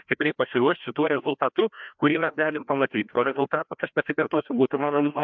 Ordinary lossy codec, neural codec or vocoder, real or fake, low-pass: MP3, 64 kbps; codec, 16 kHz, 1 kbps, FreqCodec, larger model; fake; 7.2 kHz